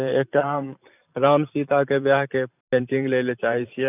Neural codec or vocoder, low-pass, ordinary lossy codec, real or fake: vocoder, 44.1 kHz, 128 mel bands every 512 samples, BigVGAN v2; 3.6 kHz; none; fake